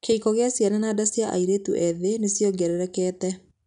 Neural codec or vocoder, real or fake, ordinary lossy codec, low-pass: none; real; none; 10.8 kHz